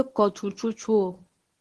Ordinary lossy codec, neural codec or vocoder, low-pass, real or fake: Opus, 16 kbps; codec, 24 kHz, 0.9 kbps, WavTokenizer, medium speech release version 1; 10.8 kHz; fake